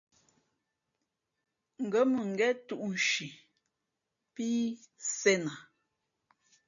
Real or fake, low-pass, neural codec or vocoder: real; 7.2 kHz; none